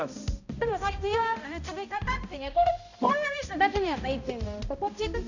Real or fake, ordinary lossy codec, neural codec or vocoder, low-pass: fake; none; codec, 16 kHz, 0.5 kbps, X-Codec, HuBERT features, trained on balanced general audio; 7.2 kHz